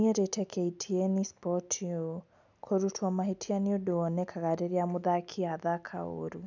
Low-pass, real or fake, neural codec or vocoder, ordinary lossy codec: 7.2 kHz; real; none; none